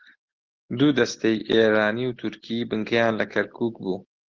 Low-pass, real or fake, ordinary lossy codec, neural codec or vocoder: 7.2 kHz; real; Opus, 16 kbps; none